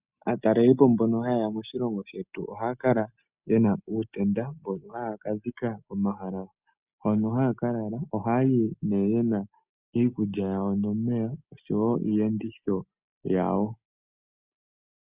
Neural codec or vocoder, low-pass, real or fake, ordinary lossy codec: none; 3.6 kHz; real; Opus, 64 kbps